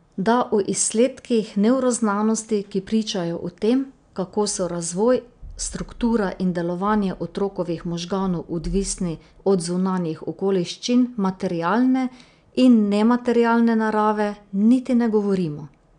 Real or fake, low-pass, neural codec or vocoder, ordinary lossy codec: real; 9.9 kHz; none; none